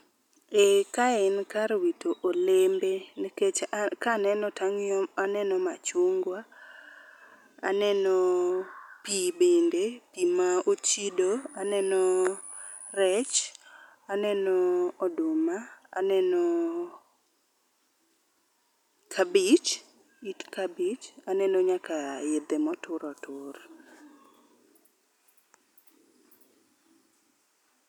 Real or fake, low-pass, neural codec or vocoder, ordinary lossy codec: real; 19.8 kHz; none; none